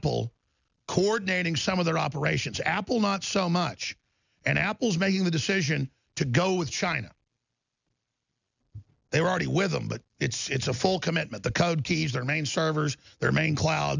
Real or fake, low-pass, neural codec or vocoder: real; 7.2 kHz; none